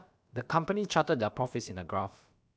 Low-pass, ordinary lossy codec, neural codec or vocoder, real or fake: none; none; codec, 16 kHz, about 1 kbps, DyCAST, with the encoder's durations; fake